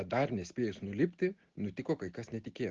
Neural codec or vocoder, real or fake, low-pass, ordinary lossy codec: none; real; 7.2 kHz; Opus, 16 kbps